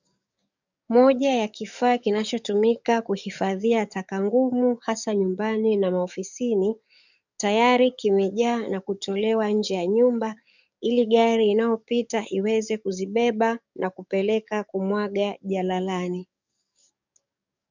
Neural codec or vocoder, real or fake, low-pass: codec, 44.1 kHz, 7.8 kbps, DAC; fake; 7.2 kHz